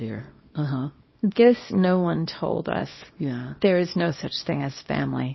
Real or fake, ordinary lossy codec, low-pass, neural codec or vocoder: fake; MP3, 24 kbps; 7.2 kHz; codec, 24 kHz, 0.9 kbps, WavTokenizer, small release